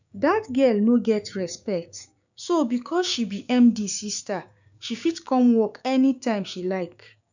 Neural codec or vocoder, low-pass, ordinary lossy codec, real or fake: codec, 16 kHz, 6 kbps, DAC; 7.2 kHz; none; fake